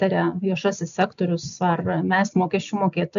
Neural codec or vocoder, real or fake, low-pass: none; real; 7.2 kHz